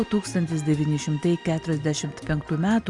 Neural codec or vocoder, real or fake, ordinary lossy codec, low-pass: none; real; Opus, 64 kbps; 10.8 kHz